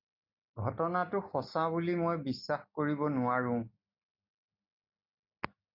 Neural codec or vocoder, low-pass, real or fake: none; 5.4 kHz; real